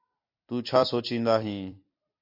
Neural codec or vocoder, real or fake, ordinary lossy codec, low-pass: vocoder, 44.1 kHz, 128 mel bands every 256 samples, BigVGAN v2; fake; MP3, 32 kbps; 5.4 kHz